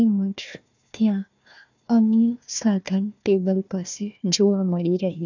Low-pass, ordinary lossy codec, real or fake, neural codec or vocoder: 7.2 kHz; none; fake; codec, 16 kHz, 1 kbps, FunCodec, trained on Chinese and English, 50 frames a second